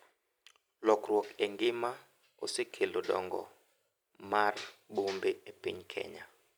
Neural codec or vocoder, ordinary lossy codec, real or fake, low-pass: vocoder, 44.1 kHz, 128 mel bands every 256 samples, BigVGAN v2; none; fake; none